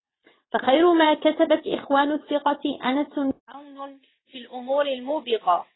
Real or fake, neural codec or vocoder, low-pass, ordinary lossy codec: fake; vocoder, 22.05 kHz, 80 mel bands, WaveNeXt; 7.2 kHz; AAC, 16 kbps